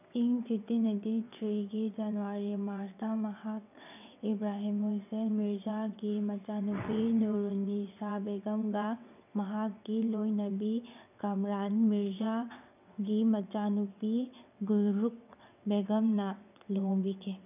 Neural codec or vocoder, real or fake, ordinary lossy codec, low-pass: vocoder, 22.05 kHz, 80 mel bands, WaveNeXt; fake; none; 3.6 kHz